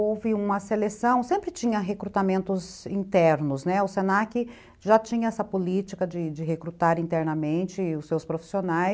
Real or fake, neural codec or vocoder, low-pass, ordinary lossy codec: real; none; none; none